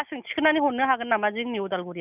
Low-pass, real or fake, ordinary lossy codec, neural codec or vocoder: 3.6 kHz; real; none; none